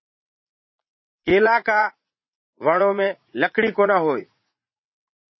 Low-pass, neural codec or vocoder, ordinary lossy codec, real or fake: 7.2 kHz; vocoder, 44.1 kHz, 80 mel bands, Vocos; MP3, 24 kbps; fake